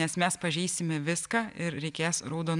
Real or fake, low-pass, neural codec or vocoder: real; 10.8 kHz; none